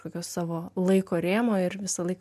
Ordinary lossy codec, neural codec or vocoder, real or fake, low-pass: MP3, 96 kbps; none; real; 14.4 kHz